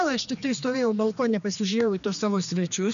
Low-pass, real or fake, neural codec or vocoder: 7.2 kHz; fake; codec, 16 kHz, 2 kbps, X-Codec, HuBERT features, trained on general audio